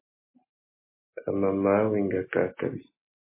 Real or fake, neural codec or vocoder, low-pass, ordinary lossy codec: real; none; 3.6 kHz; MP3, 16 kbps